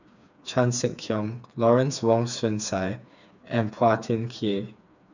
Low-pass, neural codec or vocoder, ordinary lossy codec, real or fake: 7.2 kHz; codec, 16 kHz, 4 kbps, FreqCodec, smaller model; none; fake